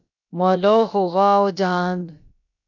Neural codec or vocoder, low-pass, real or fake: codec, 16 kHz, about 1 kbps, DyCAST, with the encoder's durations; 7.2 kHz; fake